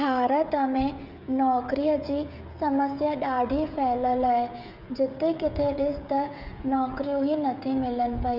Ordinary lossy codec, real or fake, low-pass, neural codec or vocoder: none; fake; 5.4 kHz; codec, 16 kHz, 16 kbps, FreqCodec, smaller model